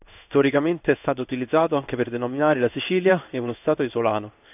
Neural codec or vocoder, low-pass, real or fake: codec, 16 kHz in and 24 kHz out, 1 kbps, XY-Tokenizer; 3.6 kHz; fake